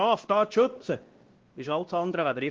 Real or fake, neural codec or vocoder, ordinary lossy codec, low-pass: fake; codec, 16 kHz, 1 kbps, X-Codec, WavLM features, trained on Multilingual LibriSpeech; Opus, 16 kbps; 7.2 kHz